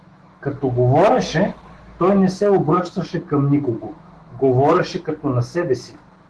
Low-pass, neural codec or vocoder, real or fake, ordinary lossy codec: 10.8 kHz; autoencoder, 48 kHz, 128 numbers a frame, DAC-VAE, trained on Japanese speech; fake; Opus, 16 kbps